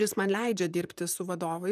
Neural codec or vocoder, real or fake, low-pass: vocoder, 44.1 kHz, 128 mel bands, Pupu-Vocoder; fake; 14.4 kHz